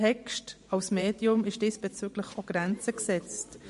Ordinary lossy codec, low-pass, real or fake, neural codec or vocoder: MP3, 48 kbps; 14.4 kHz; fake; vocoder, 44.1 kHz, 128 mel bands every 512 samples, BigVGAN v2